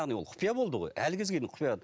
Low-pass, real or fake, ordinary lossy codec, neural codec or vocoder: none; real; none; none